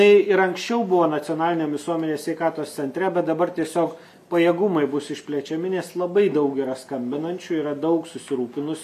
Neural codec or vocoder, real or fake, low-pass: none; real; 14.4 kHz